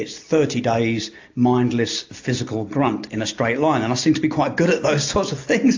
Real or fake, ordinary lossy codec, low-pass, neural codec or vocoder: real; AAC, 48 kbps; 7.2 kHz; none